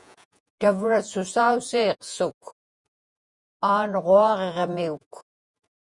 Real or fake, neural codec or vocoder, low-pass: fake; vocoder, 48 kHz, 128 mel bands, Vocos; 10.8 kHz